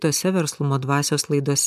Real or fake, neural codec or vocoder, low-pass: real; none; 14.4 kHz